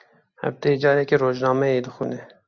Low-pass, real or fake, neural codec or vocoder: 7.2 kHz; real; none